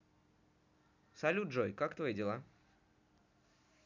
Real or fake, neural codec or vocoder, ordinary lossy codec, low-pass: real; none; none; 7.2 kHz